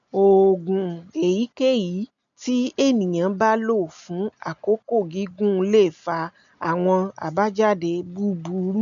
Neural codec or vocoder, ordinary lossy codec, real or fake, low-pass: none; none; real; 7.2 kHz